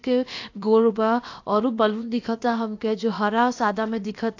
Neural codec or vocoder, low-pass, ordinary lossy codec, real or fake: codec, 16 kHz, about 1 kbps, DyCAST, with the encoder's durations; 7.2 kHz; AAC, 48 kbps; fake